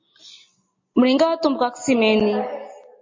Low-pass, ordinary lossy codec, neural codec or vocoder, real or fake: 7.2 kHz; MP3, 32 kbps; none; real